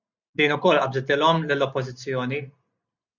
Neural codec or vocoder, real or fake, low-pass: none; real; 7.2 kHz